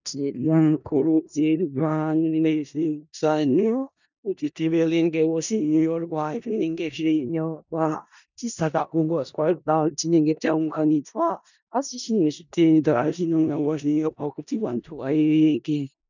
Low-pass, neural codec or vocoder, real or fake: 7.2 kHz; codec, 16 kHz in and 24 kHz out, 0.4 kbps, LongCat-Audio-Codec, four codebook decoder; fake